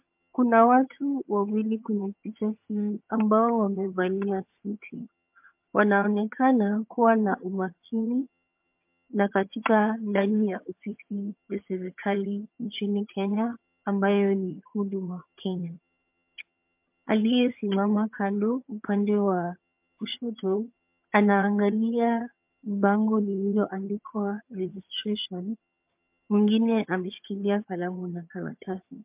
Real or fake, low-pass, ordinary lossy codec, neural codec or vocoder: fake; 3.6 kHz; MP3, 32 kbps; vocoder, 22.05 kHz, 80 mel bands, HiFi-GAN